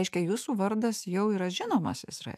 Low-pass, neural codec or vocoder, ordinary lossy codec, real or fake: 14.4 kHz; autoencoder, 48 kHz, 128 numbers a frame, DAC-VAE, trained on Japanese speech; MP3, 96 kbps; fake